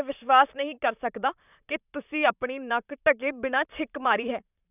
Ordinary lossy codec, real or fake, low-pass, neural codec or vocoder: none; fake; 3.6 kHz; vocoder, 44.1 kHz, 128 mel bands, Pupu-Vocoder